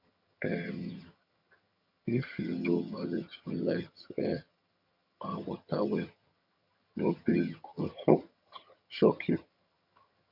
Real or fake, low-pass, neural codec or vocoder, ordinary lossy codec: fake; 5.4 kHz; vocoder, 22.05 kHz, 80 mel bands, HiFi-GAN; none